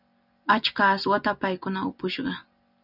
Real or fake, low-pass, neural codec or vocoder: real; 5.4 kHz; none